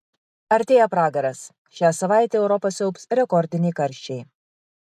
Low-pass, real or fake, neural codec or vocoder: 14.4 kHz; real; none